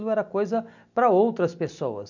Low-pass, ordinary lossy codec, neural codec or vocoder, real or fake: 7.2 kHz; none; none; real